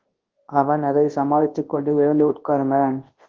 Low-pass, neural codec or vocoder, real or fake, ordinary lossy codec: 7.2 kHz; codec, 24 kHz, 0.9 kbps, WavTokenizer, large speech release; fake; Opus, 16 kbps